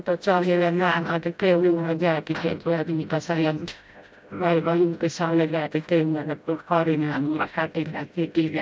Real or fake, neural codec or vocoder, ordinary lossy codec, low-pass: fake; codec, 16 kHz, 0.5 kbps, FreqCodec, smaller model; none; none